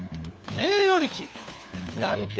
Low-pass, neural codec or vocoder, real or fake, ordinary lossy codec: none; codec, 16 kHz, 4 kbps, FunCodec, trained on LibriTTS, 50 frames a second; fake; none